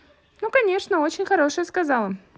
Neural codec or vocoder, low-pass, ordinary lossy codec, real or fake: none; none; none; real